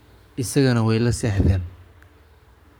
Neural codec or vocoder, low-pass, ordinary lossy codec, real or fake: codec, 44.1 kHz, 7.8 kbps, Pupu-Codec; none; none; fake